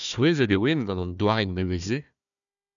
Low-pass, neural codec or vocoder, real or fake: 7.2 kHz; codec, 16 kHz, 1 kbps, FunCodec, trained on Chinese and English, 50 frames a second; fake